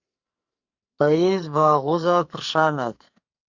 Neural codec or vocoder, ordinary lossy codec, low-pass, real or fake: codec, 44.1 kHz, 2.6 kbps, SNAC; Opus, 64 kbps; 7.2 kHz; fake